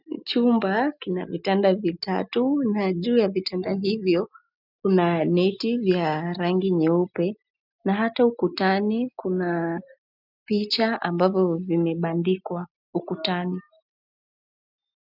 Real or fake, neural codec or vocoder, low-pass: real; none; 5.4 kHz